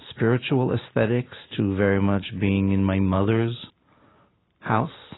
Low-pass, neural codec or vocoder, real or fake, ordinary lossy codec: 7.2 kHz; none; real; AAC, 16 kbps